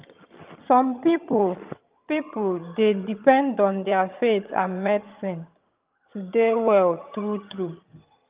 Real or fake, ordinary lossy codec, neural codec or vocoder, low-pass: fake; Opus, 24 kbps; vocoder, 22.05 kHz, 80 mel bands, HiFi-GAN; 3.6 kHz